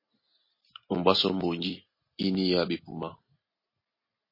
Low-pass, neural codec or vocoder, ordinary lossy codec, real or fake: 5.4 kHz; none; MP3, 24 kbps; real